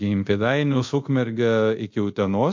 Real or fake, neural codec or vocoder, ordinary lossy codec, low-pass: fake; codec, 24 kHz, 0.5 kbps, DualCodec; MP3, 48 kbps; 7.2 kHz